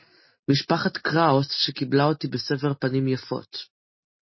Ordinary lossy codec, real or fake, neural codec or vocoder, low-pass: MP3, 24 kbps; real; none; 7.2 kHz